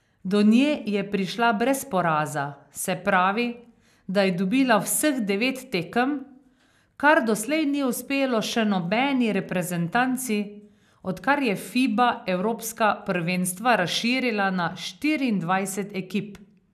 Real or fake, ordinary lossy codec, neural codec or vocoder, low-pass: real; none; none; 14.4 kHz